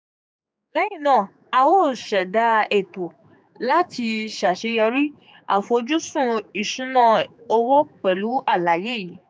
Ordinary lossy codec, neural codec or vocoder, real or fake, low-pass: none; codec, 16 kHz, 4 kbps, X-Codec, HuBERT features, trained on general audio; fake; none